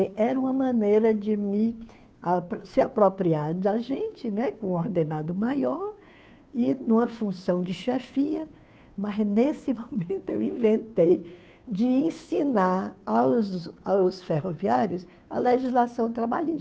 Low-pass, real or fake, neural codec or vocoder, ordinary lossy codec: none; fake; codec, 16 kHz, 2 kbps, FunCodec, trained on Chinese and English, 25 frames a second; none